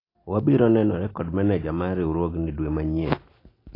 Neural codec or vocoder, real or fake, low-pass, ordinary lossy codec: none; real; 5.4 kHz; AAC, 24 kbps